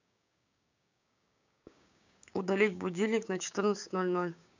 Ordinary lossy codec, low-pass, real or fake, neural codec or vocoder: none; 7.2 kHz; fake; codec, 16 kHz, 6 kbps, DAC